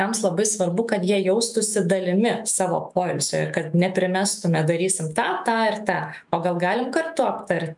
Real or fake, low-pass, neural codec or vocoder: fake; 10.8 kHz; autoencoder, 48 kHz, 128 numbers a frame, DAC-VAE, trained on Japanese speech